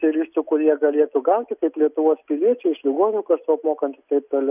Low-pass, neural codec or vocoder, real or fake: 3.6 kHz; none; real